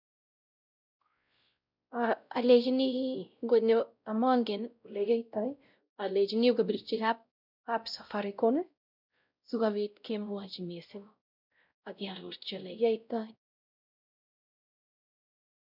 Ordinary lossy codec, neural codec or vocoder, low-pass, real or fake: none; codec, 16 kHz, 0.5 kbps, X-Codec, WavLM features, trained on Multilingual LibriSpeech; 5.4 kHz; fake